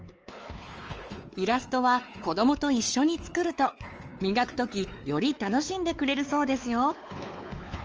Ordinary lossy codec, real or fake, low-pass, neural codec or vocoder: Opus, 24 kbps; fake; 7.2 kHz; codec, 16 kHz, 4 kbps, X-Codec, WavLM features, trained on Multilingual LibriSpeech